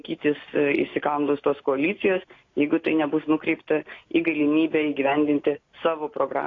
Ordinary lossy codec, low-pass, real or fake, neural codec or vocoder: AAC, 32 kbps; 7.2 kHz; real; none